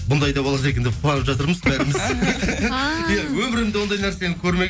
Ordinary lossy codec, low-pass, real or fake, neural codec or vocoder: none; none; real; none